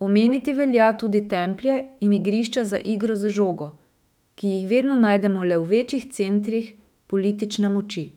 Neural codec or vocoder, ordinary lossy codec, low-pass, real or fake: autoencoder, 48 kHz, 32 numbers a frame, DAC-VAE, trained on Japanese speech; none; 19.8 kHz; fake